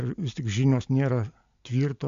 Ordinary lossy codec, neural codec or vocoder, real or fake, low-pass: AAC, 64 kbps; none; real; 7.2 kHz